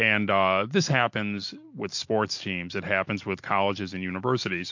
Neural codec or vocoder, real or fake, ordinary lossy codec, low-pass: none; real; MP3, 48 kbps; 7.2 kHz